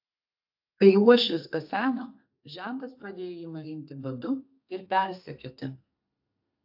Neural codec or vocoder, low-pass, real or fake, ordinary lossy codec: codec, 32 kHz, 1.9 kbps, SNAC; 5.4 kHz; fake; AAC, 48 kbps